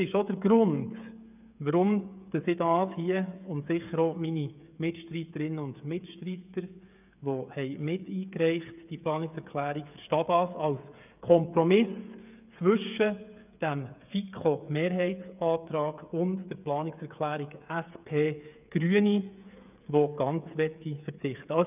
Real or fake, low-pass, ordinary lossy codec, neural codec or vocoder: fake; 3.6 kHz; none; codec, 16 kHz, 8 kbps, FreqCodec, smaller model